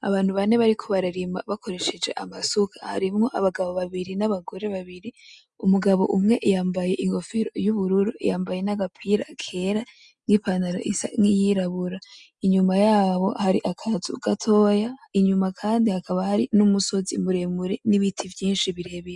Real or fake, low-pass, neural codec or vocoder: real; 10.8 kHz; none